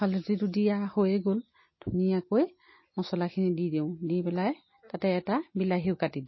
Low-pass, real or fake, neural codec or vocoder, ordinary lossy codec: 7.2 kHz; real; none; MP3, 24 kbps